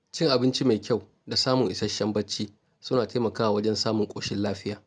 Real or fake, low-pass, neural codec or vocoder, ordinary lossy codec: real; none; none; none